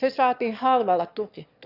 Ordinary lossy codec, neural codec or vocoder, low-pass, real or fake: none; autoencoder, 22.05 kHz, a latent of 192 numbers a frame, VITS, trained on one speaker; 5.4 kHz; fake